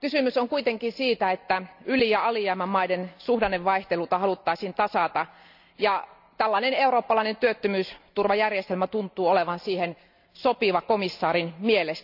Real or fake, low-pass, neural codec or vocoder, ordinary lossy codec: real; 5.4 kHz; none; none